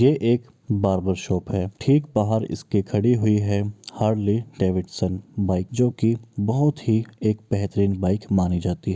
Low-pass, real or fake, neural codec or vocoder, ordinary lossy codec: none; real; none; none